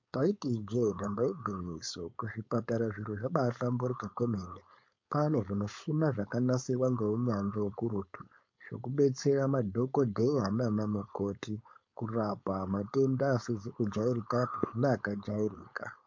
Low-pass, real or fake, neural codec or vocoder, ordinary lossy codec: 7.2 kHz; fake; codec, 16 kHz, 4.8 kbps, FACodec; MP3, 48 kbps